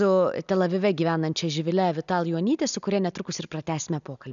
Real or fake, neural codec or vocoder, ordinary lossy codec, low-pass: real; none; MP3, 96 kbps; 7.2 kHz